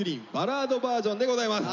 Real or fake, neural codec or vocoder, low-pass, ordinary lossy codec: real; none; 7.2 kHz; none